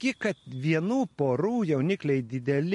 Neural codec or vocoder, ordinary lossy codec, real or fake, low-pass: none; MP3, 48 kbps; real; 14.4 kHz